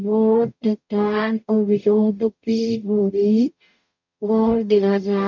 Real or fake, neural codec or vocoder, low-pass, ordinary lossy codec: fake; codec, 44.1 kHz, 0.9 kbps, DAC; 7.2 kHz; MP3, 64 kbps